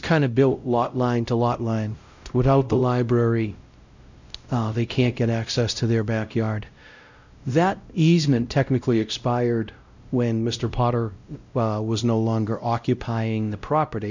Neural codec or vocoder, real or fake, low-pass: codec, 16 kHz, 0.5 kbps, X-Codec, WavLM features, trained on Multilingual LibriSpeech; fake; 7.2 kHz